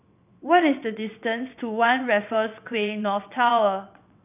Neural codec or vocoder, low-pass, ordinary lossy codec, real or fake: vocoder, 22.05 kHz, 80 mel bands, WaveNeXt; 3.6 kHz; none; fake